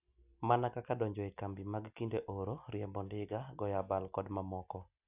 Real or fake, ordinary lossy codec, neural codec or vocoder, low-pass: real; none; none; 3.6 kHz